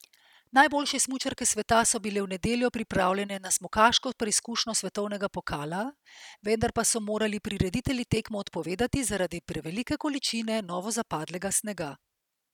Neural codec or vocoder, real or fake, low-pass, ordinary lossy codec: none; real; 19.8 kHz; none